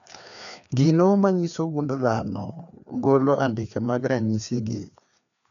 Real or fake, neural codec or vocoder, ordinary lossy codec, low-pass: fake; codec, 16 kHz, 2 kbps, FreqCodec, larger model; none; 7.2 kHz